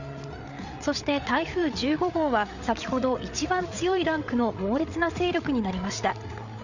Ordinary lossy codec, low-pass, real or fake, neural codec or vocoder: none; 7.2 kHz; fake; codec, 16 kHz, 8 kbps, FreqCodec, larger model